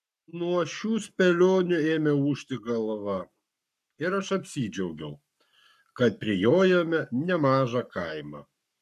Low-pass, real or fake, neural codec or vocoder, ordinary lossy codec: 14.4 kHz; fake; codec, 44.1 kHz, 7.8 kbps, Pupu-Codec; MP3, 96 kbps